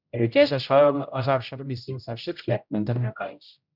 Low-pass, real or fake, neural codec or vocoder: 5.4 kHz; fake; codec, 16 kHz, 0.5 kbps, X-Codec, HuBERT features, trained on general audio